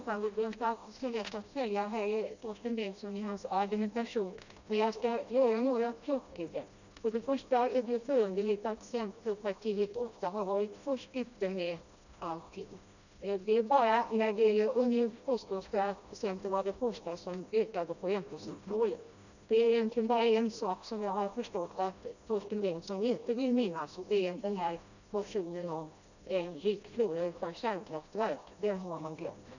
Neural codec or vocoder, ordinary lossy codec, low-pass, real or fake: codec, 16 kHz, 1 kbps, FreqCodec, smaller model; none; 7.2 kHz; fake